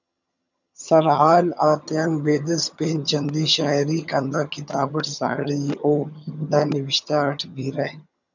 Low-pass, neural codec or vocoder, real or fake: 7.2 kHz; vocoder, 22.05 kHz, 80 mel bands, HiFi-GAN; fake